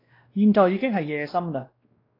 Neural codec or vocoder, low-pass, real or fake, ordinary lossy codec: codec, 16 kHz, 1 kbps, X-Codec, WavLM features, trained on Multilingual LibriSpeech; 5.4 kHz; fake; AAC, 24 kbps